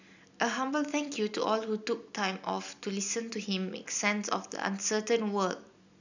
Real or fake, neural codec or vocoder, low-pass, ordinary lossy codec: real; none; 7.2 kHz; none